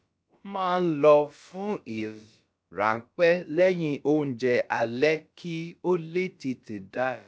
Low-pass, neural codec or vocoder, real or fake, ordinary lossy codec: none; codec, 16 kHz, about 1 kbps, DyCAST, with the encoder's durations; fake; none